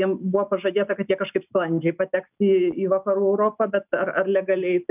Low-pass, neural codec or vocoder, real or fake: 3.6 kHz; none; real